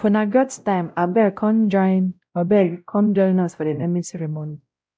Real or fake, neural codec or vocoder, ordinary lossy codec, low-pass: fake; codec, 16 kHz, 0.5 kbps, X-Codec, WavLM features, trained on Multilingual LibriSpeech; none; none